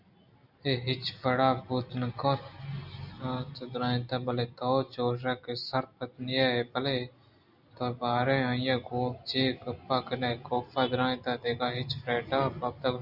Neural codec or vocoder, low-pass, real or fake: none; 5.4 kHz; real